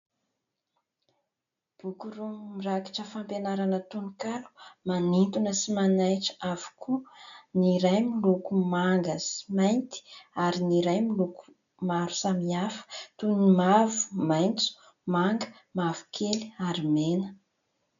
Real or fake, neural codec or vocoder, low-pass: real; none; 7.2 kHz